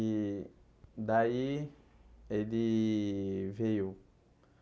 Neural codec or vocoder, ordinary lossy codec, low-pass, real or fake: none; none; none; real